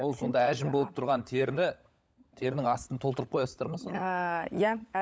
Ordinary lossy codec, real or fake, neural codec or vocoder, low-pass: none; fake; codec, 16 kHz, 16 kbps, FunCodec, trained on LibriTTS, 50 frames a second; none